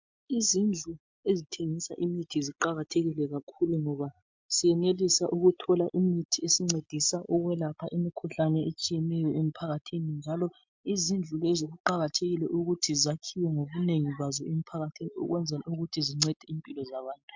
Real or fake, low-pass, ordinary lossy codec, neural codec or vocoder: real; 7.2 kHz; MP3, 64 kbps; none